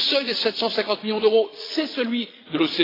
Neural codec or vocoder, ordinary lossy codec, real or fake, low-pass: vocoder, 22.05 kHz, 80 mel bands, Vocos; AAC, 24 kbps; fake; 5.4 kHz